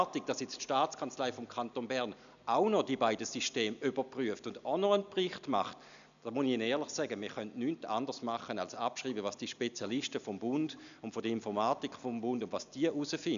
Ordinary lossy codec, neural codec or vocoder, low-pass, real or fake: none; none; 7.2 kHz; real